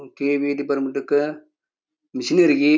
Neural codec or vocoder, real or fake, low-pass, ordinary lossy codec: none; real; none; none